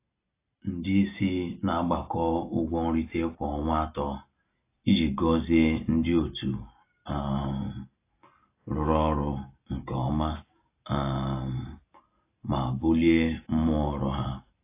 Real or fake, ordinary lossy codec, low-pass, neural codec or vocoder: real; AAC, 24 kbps; 3.6 kHz; none